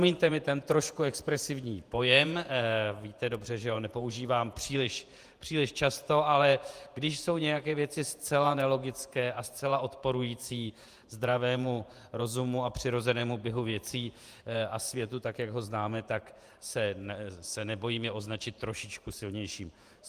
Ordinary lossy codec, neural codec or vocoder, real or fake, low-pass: Opus, 32 kbps; vocoder, 48 kHz, 128 mel bands, Vocos; fake; 14.4 kHz